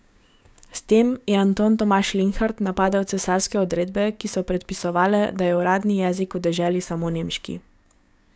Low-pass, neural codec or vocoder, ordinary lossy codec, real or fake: none; codec, 16 kHz, 6 kbps, DAC; none; fake